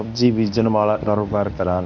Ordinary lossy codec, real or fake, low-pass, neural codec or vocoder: none; fake; 7.2 kHz; codec, 24 kHz, 0.9 kbps, WavTokenizer, medium speech release version 1